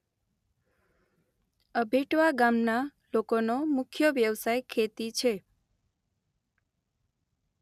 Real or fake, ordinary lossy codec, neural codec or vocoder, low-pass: real; none; none; 14.4 kHz